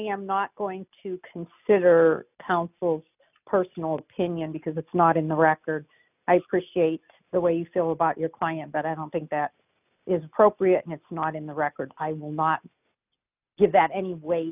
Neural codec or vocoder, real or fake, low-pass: none; real; 3.6 kHz